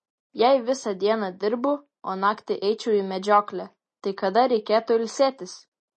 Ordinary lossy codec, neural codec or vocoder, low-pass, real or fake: MP3, 32 kbps; none; 9.9 kHz; real